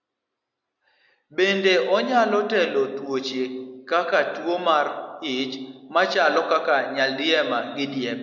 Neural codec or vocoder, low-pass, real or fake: none; 7.2 kHz; real